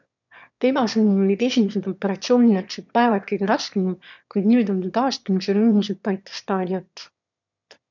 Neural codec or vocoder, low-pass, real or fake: autoencoder, 22.05 kHz, a latent of 192 numbers a frame, VITS, trained on one speaker; 7.2 kHz; fake